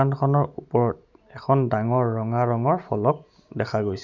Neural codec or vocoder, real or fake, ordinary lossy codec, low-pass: none; real; none; 7.2 kHz